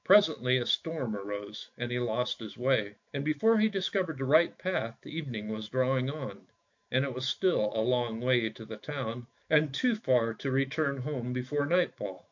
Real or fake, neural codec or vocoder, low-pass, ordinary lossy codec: fake; autoencoder, 48 kHz, 128 numbers a frame, DAC-VAE, trained on Japanese speech; 7.2 kHz; MP3, 48 kbps